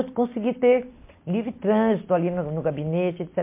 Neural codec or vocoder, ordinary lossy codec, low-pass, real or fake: none; MP3, 24 kbps; 3.6 kHz; real